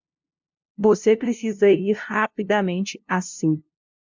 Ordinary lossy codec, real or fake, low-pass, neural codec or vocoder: MP3, 64 kbps; fake; 7.2 kHz; codec, 16 kHz, 0.5 kbps, FunCodec, trained on LibriTTS, 25 frames a second